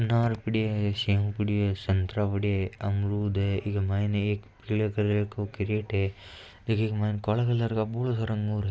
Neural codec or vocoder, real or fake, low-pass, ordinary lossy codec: none; real; none; none